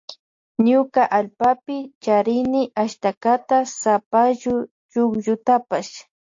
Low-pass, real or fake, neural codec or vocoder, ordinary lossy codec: 7.2 kHz; real; none; AAC, 48 kbps